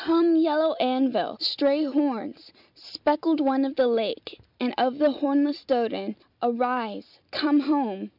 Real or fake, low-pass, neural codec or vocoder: real; 5.4 kHz; none